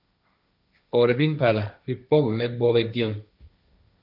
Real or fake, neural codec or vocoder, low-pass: fake; codec, 16 kHz, 1.1 kbps, Voila-Tokenizer; 5.4 kHz